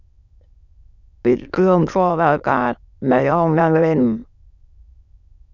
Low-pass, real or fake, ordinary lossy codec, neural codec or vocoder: 7.2 kHz; fake; none; autoencoder, 22.05 kHz, a latent of 192 numbers a frame, VITS, trained on many speakers